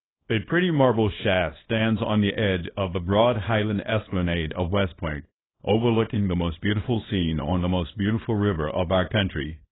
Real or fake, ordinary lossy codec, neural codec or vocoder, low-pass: fake; AAC, 16 kbps; codec, 24 kHz, 0.9 kbps, WavTokenizer, small release; 7.2 kHz